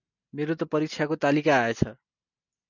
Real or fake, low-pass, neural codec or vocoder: real; 7.2 kHz; none